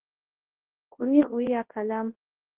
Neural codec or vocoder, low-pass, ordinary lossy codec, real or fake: codec, 24 kHz, 0.9 kbps, WavTokenizer, large speech release; 3.6 kHz; Opus, 16 kbps; fake